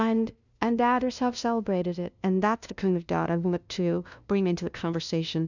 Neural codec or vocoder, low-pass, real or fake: codec, 16 kHz, 0.5 kbps, FunCodec, trained on LibriTTS, 25 frames a second; 7.2 kHz; fake